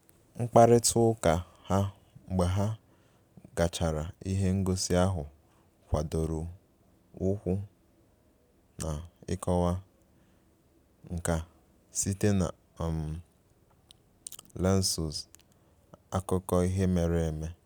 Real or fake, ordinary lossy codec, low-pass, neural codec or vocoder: real; none; none; none